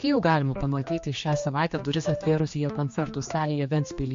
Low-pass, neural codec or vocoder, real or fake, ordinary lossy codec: 7.2 kHz; codec, 16 kHz, 2 kbps, X-Codec, HuBERT features, trained on general audio; fake; MP3, 48 kbps